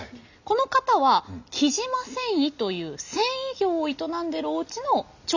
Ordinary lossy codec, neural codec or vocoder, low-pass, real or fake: none; none; 7.2 kHz; real